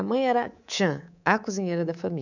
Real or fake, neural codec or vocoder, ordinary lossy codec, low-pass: fake; codec, 24 kHz, 3.1 kbps, DualCodec; none; 7.2 kHz